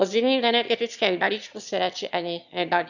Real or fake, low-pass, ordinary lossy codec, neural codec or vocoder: fake; 7.2 kHz; none; autoencoder, 22.05 kHz, a latent of 192 numbers a frame, VITS, trained on one speaker